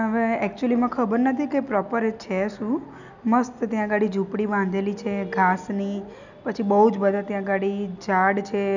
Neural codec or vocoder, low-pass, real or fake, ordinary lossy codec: none; 7.2 kHz; real; none